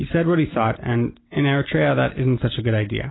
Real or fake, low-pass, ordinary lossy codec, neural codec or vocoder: real; 7.2 kHz; AAC, 16 kbps; none